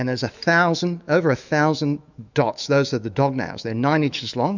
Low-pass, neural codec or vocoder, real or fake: 7.2 kHz; autoencoder, 48 kHz, 128 numbers a frame, DAC-VAE, trained on Japanese speech; fake